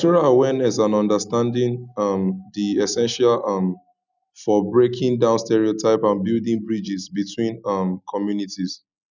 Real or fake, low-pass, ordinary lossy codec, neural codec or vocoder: real; 7.2 kHz; none; none